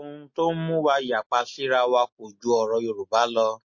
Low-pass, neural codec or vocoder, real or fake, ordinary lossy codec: 7.2 kHz; none; real; MP3, 32 kbps